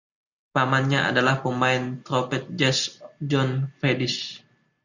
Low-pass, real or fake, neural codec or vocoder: 7.2 kHz; real; none